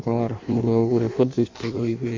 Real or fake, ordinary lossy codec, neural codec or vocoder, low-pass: fake; MP3, 48 kbps; codec, 16 kHz in and 24 kHz out, 1.1 kbps, FireRedTTS-2 codec; 7.2 kHz